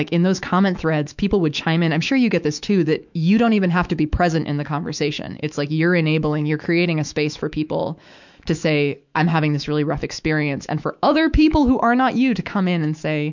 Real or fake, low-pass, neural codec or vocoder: fake; 7.2 kHz; autoencoder, 48 kHz, 128 numbers a frame, DAC-VAE, trained on Japanese speech